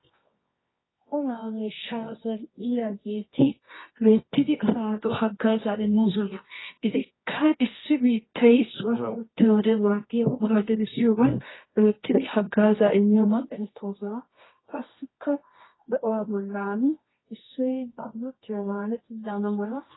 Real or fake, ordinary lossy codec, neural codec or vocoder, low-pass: fake; AAC, 16 kbps; codec, 24 kHz, 0.9 kbps, WavTokenizer, medium music audio release; 7.2 kHz